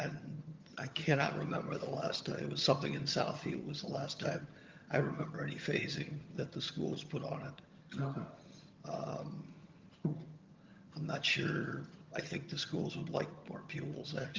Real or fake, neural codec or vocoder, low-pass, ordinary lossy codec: fake; vocoder, 22.05 kHz, 80 mel bands, HiFi-GAN; 7.2 kHz; Opus, 32 kbps